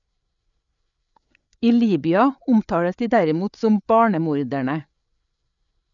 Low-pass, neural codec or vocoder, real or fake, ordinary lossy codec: 7.2 kHz; codec, 16 kHz, 8 kbps, FreqCodec, larger model; fake; none